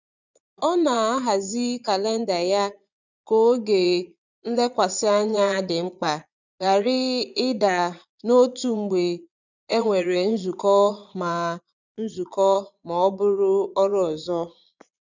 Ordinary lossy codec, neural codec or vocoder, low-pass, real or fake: none; vocoder, 22.05 kHz, 80 mel bands, Vocos; 7.2 kHz; fake